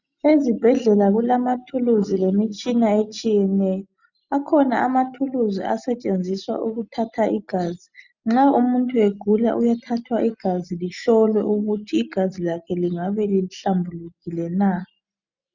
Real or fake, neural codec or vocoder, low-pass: real; none; 7.2 kHz